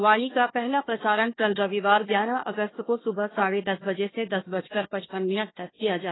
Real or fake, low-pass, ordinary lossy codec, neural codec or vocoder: fake; 7.2 kHz; AAC, 16 kbps; codec, 16 kHz, 1 kbps, FunCodec, trained on Chinese and English, 50 frames a second